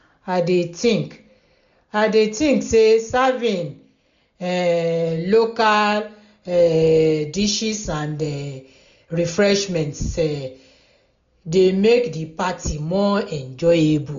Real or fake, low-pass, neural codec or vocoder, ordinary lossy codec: real; 7.2 kHz; none; MP3, 64 kbps